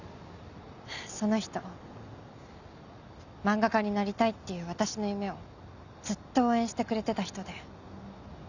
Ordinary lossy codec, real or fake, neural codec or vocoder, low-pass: none; real; none; 7.2 kHz